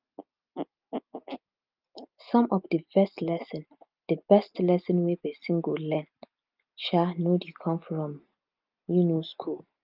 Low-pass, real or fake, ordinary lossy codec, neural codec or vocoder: 5.4 kHz; real; Opus, 24 kbps; none